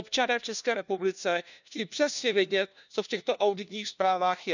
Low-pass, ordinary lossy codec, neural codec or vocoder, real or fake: 7.2 kHz; none; codec, 16 kHz, 1 kbps, FunCodec, trained on LibriTTS, 50 frames a second; fake